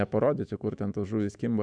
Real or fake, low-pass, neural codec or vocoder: fake; 9.9 kHz; autoencoder, 48 kHz, 128 numbers a frame, DAC-VAE, trained on Japanese speech